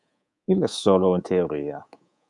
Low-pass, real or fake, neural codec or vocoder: 10.8 kHz; fake; codec, 24 kHz, 3.1 kbps, DualCodec